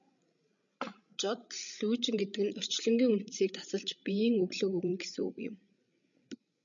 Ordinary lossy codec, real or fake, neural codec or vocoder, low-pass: MP3, 96 kbps; fake; codec, 16 kHz, 16 kbps, FreqCodec, larger model; 7.2 kHz